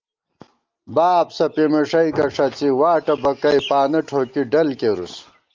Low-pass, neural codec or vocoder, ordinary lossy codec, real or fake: 7.2 kHz; none; Opus, 24 kbps; real